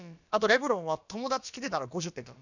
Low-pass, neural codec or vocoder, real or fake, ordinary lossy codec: 7.2 kHz; codec, 16 kHz, about 1 kbps, DyCAST, with the encoder's durations; fake; none